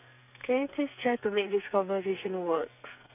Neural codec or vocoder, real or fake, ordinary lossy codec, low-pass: codec, 44.1 kHz, 2.6 kbps, SNAC; fake; none; 3.6 kHz